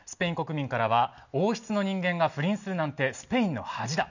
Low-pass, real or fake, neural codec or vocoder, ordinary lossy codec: 7.2 kHz; real; none; none